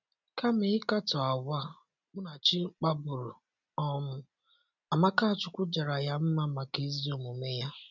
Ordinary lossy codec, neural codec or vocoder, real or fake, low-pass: none; none; real; 7.2 kHz